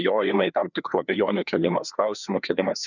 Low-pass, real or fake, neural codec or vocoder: 7.2 kHz; fake; codec, 16 kHz, 2 kbps, FreqCodec, larger model